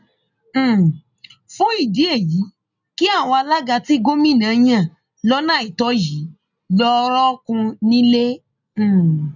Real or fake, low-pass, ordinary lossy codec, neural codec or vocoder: real; 7.2 kHz; none; none